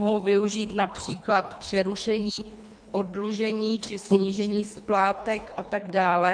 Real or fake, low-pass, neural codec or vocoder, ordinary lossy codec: fake; 9.9 kHz; codec, 24 kHz, 1.5 kbps, HILCodec; MP3, 64 kbps